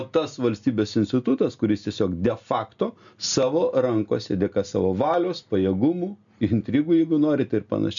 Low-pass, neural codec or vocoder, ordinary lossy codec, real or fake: 7.2 kHz; none; MP3, 96 kbps; real